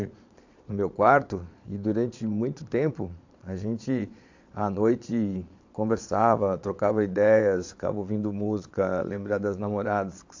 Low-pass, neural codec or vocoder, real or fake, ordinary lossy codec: 7.2 kHz; vocoder, 22.05 kHz, 80 mel bands, Vocos; fake; AAC, 48 kbps